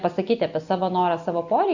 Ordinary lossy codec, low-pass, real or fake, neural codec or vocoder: Opus, 64 kbps; 7.2 kHz; real; none